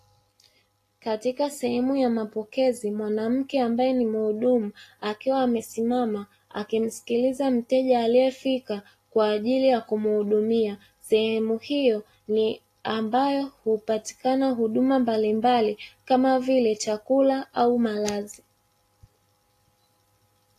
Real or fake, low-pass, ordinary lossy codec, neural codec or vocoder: real; 14.4 kHz; AAC, 48 kbps; none